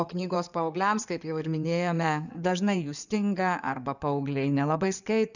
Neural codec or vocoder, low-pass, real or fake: codec, 16 kHz in and 24 kHz out, 2.2 kbps, FireRedTTS-2 codec; 7.2 kHz; fake